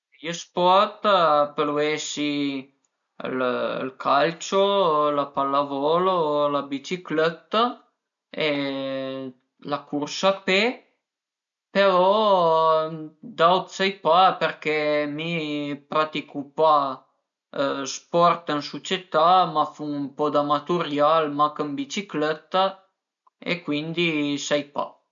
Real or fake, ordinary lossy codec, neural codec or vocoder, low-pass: real; none; none; 7.2 kHz